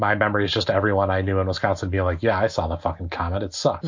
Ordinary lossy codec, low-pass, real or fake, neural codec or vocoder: MP3, 48 kbps; 7.2 kHz; real; none